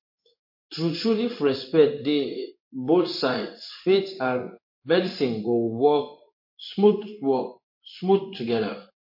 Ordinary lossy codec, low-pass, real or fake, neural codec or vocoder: MP3, 32 kbps; 5.4 kHz; fake; codec, 16 kHz in and 24 kHz out, 1 kbps, XY-Tokenizer